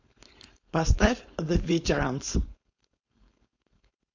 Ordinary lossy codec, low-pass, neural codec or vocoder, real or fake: AAC, 48 kbps; 7.2 kHz; codec, 16 kHz, 4.8 kbps, FACodec; fake